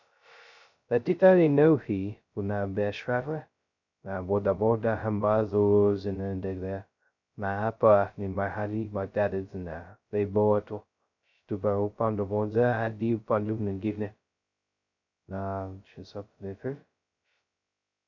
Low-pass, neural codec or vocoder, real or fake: 7.2 kHz; codec, 16 kHz, 0.2 kbps, FocalCodec; fake